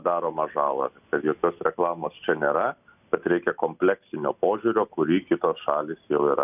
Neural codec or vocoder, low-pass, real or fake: none; 3.6 kHz; real